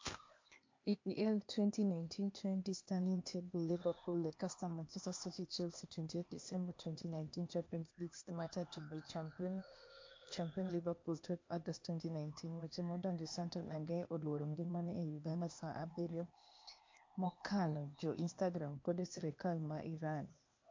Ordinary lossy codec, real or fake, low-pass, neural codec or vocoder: MP3, 48 kbps; fake; 7.2 kHz; codec, 16 kHz, 0.8 kbps, ZipCodec